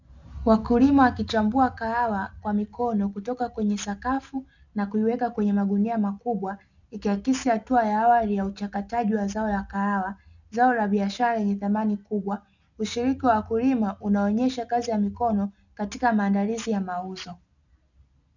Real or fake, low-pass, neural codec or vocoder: real; 7.2 kHz; none